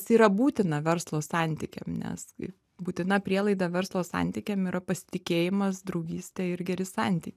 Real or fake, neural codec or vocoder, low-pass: real; none; 14.4 kHz